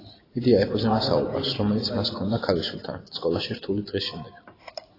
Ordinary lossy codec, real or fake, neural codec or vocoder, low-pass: AAC, 24 kbps; real; none; 5.4 kHz